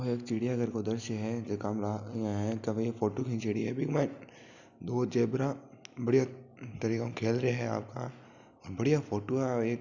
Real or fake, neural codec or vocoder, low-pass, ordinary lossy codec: real; none; 7.2 kHz; none